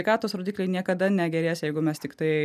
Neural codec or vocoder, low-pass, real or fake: none; 14.4 kHz; real